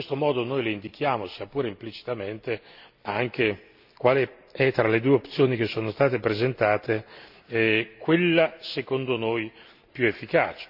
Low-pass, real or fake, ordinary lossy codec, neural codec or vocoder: 5.4 kHz; real; AAC, 48 kbps; none